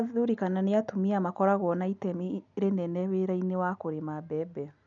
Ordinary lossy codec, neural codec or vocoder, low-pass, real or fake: none; none; 7.2 kHz; real